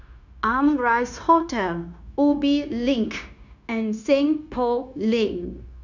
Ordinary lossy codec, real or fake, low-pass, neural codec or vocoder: none; fake; 7.2 kHz; codec, 16 kHz, 0.9 kbps, LongCat-Audio-Codec